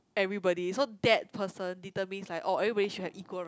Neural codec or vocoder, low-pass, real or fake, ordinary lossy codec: none; none; real; none